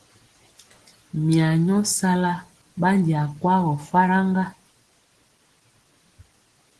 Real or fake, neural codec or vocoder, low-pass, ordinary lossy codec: real; none; 10.8 kHz; Opus, 16 kbps